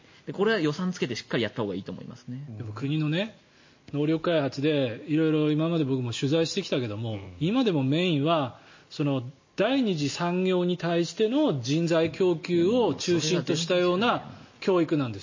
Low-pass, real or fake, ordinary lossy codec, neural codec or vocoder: 7.2 kHz; real; MP3, 32 kbps; none